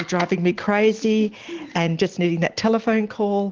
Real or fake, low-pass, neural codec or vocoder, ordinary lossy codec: real; 7.2 kHz; none; Opus, 16 kbps